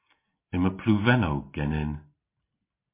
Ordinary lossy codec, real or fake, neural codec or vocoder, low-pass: MP3, 24 kbps; real; none; 3.6 kHz